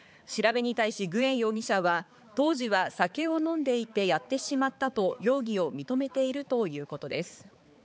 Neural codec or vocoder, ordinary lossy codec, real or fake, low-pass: codec, 16 kHz, 4 kbps, X-Codec, HuBERT features, trained on balanced general audio; none; fake; none